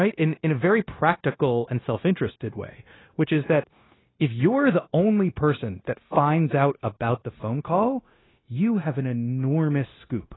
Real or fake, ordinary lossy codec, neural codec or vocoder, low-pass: fake; AAC, 16 kbps; codec, 16 kHz, 0.9 kbps, LongCat-Audio-Codec; 7.2 kHz